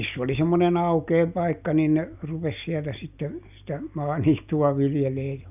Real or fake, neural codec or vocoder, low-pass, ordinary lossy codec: real; none; 3.6 kHz; none